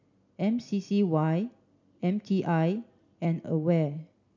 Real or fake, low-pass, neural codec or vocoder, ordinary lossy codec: real; 7.2 kHz; none; none